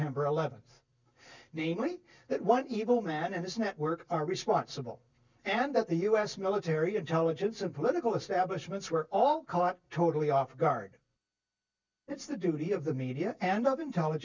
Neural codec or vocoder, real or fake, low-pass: none; real; 7.2 kHz